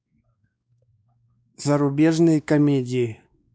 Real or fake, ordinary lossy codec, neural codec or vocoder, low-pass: fake; none; codec, 16 kHz, 2 kbps, X-Codec, WavLM features, trained on Multilingual LibriSpeech; none